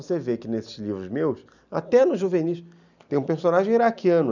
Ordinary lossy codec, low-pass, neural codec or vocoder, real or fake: none; 7.2 kHz; none; real